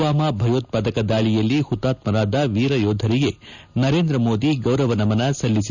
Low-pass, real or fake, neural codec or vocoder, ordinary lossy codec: 7.2 kHz; real; none; none